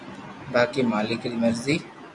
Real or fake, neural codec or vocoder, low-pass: real; none; 10.8 kHz